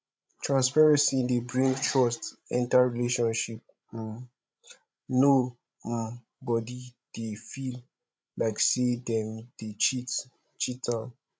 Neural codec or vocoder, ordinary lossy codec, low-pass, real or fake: codec, 16 kHz, 16 kbps, FreqCodec, larger model; none; none; fake